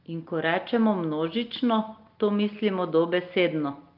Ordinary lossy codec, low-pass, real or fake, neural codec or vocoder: Opus, 32 kbps; 5.4 kHz; real; none